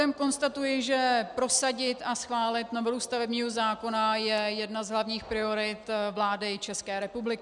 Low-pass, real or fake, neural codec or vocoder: 10.8 kHz; real; none